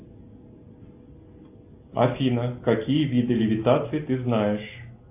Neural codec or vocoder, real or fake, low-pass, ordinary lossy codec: none; real; 3.6 kHz; AAC, 32 kbps